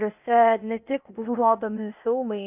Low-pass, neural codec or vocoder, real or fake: 3.6 kHz; codec, 16 kHz, 0.8 kbps, ZipCodec; fake